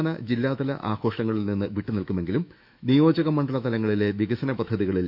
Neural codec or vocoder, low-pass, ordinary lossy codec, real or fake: autoencoder, 48 kHz, 128 numbers a frame, DAC-VAE, trained on Japanese speech; 5.4 kHz; none; fake